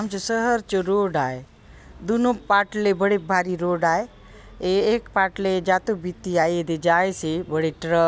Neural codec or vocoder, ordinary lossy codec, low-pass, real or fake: none; none; none; real